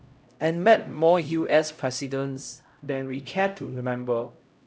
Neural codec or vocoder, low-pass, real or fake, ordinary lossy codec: codec, 16 kHz, 0.5 kbps, X-Codec, HuBERT features, trained on LibriSpeech; none; fake; none